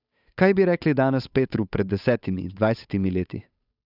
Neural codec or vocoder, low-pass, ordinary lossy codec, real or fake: codec, 16 kHz, 8 kbps, FunCodec, trained on Chinese and English, 25 frames a second; 5.4 kHz; none; fake